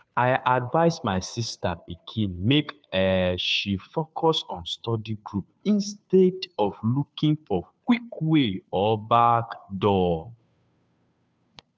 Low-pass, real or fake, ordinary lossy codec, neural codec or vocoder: none; fake; none; codec, 16 kHz, 2 kbps, FunCodec, trained on Chinese and English, 25 frames a second